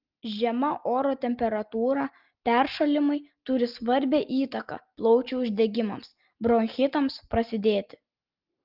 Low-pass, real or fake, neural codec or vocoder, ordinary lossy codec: 5.4 kHz; real; none; Opus, 32 kbps